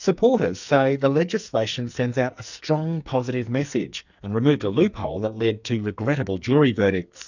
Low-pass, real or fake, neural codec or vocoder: 7.2 kHz; fake; codec, 44.1 kHz, 2.6 kbps, SNAC